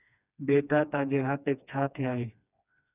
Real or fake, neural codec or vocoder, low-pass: fake; codec, 16 kHz, 2 kbps, FreqCodec, smaller model; 3.6 kHz